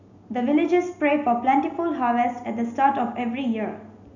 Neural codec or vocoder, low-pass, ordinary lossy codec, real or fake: none; 7.2 kHz; none; real